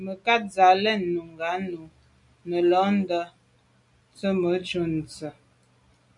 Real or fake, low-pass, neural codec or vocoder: real; 10.8 kHz; none